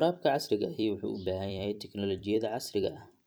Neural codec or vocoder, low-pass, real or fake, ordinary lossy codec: vocoder, 44.1 kHz, 128 mel bands every 256 samples, BigVGAN v2; none; fake; none